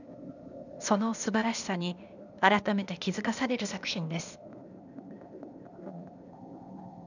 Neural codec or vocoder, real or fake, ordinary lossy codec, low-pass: codec, 16 kHz, 0.8 kbps, ZipCodec; fake; none; 7.2 kHz